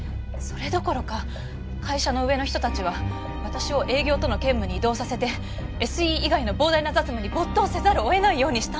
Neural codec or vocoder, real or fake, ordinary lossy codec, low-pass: none; real; none; none